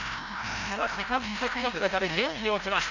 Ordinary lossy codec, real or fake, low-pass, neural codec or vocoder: none; fake; 7.2 kHz; codec, 16 kHz, 0.5 kbps, FreqCodec, larger model